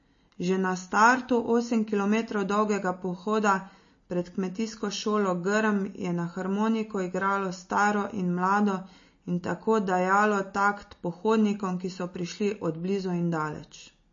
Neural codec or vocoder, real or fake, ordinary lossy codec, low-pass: none; real; MP3, 32 kbps; 7.2 kHz